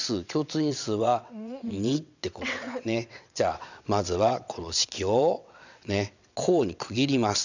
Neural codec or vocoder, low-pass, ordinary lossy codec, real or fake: vocoder, 22.05 kHz, 80 mel bands, WaveNeXt; 7.2 kHz; none; fake